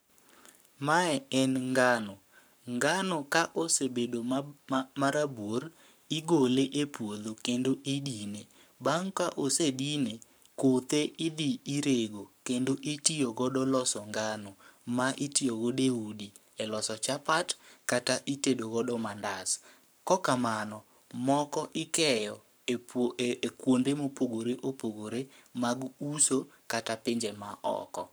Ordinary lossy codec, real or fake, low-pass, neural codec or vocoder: none; fake; none; codec, 44.1 kHz, 7.8 kbps, Pupu-Codec